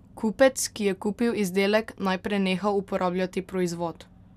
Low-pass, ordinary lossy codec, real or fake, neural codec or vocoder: 14.4 kHz; none; real; none